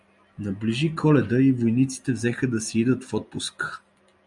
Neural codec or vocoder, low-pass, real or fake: none; 10.8 kHz; real